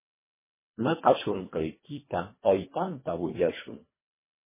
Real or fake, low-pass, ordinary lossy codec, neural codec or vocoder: fake; 3.6 kHz; MP3, 16 kbps; codec, 24 kHz, 1.5 kbps, HILCodec